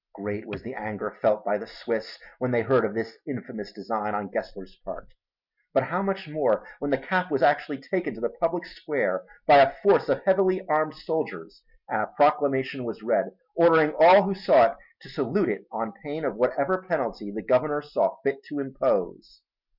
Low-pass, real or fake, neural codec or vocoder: 5.4 kHz; real; none